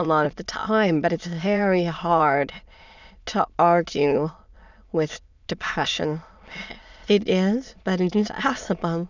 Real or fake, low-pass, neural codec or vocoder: fake; 7.2 kHz; autoencoder, 22.05 kHz, a latent of 192 numbers a frame, VITS, trained on many speakers